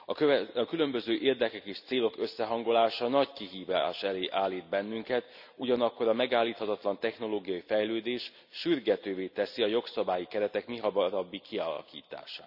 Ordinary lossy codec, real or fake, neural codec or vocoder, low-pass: none; real; none; 5.4 kHz